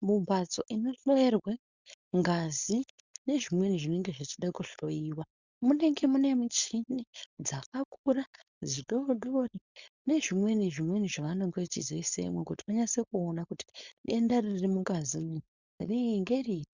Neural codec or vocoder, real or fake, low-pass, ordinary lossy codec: codec, 16 kHz, 4.8 kbps, FACodec; fake; 7.2 kHz; Opus, 64 kbps